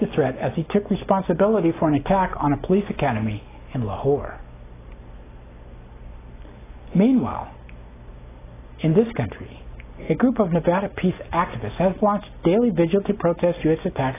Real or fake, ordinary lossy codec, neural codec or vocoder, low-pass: real; AAC, 16 kbps; none; 3.6 kHz